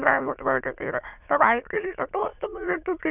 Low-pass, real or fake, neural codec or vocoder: 3.6 kHz; fake; autoencoder, 22.05 kHz, a latent of 192 numbers a frame, VITS, trained on many speakers